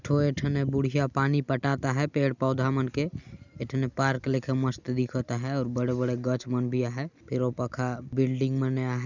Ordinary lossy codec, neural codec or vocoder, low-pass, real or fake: none; none; 7.2 kHz; real